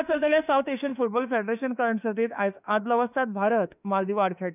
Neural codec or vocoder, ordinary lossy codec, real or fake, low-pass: autoencoder, 48 kHz, 32 numbers a frame, DAC-VAE, trained on Japanese speech; none; fake; 3.6 kHz